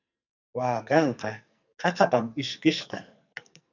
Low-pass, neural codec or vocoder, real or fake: 7.2 kHz; codec, 44.1 kHz, 2.6 kbps, SNAC; fake